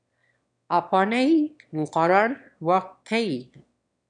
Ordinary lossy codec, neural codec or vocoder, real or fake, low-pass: MP3, 96 kbps; autoencoder, 22.05 kHz, a latent of 192 numbers a frame, VITS, trained on one speaker; fake; 9.9 kHz